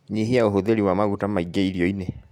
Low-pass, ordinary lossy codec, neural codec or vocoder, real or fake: 19.8 kHz; MP3, 96 kbps; none; real